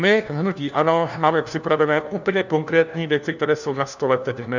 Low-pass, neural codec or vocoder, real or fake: 7.2 kHz; codec, 16 kHz, 1 kbps, FunCodec, trained on LibriTTS, 50 frames a second; fake